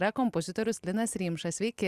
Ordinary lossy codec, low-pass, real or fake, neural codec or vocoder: Opus, 64 kbps; 14.4 kHz; real; none